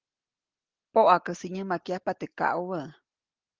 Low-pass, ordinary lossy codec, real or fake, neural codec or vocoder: 7.2 kHz; Opus, 16 kbps; real; none